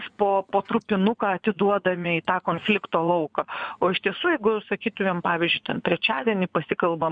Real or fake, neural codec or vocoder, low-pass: fake; vocoder, 24 kHz, 100 mel bands, Vocos; 9.9 kHz